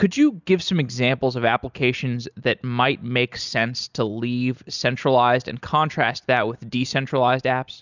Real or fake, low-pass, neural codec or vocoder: real; 7.2 kHz; none